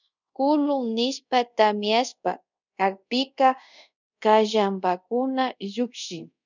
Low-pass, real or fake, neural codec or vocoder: 7.2 kHz; fake; codec, 24 kHz, 0.5 kbps, DualCodec